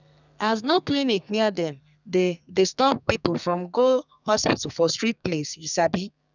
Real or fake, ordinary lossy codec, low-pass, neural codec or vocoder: fake; none; 7.2 kHz; codec, 32 kHz, 1.9 kbps, SNAC